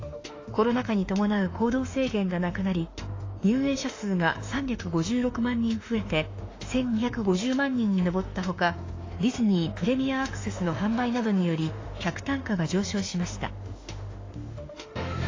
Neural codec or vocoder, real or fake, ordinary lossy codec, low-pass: autoencoder, 48 kHz, 32 numbers a frame, DAC-VAE, trained on Japanese speech; fake; AAC, 32 kbps; 7.2 kHz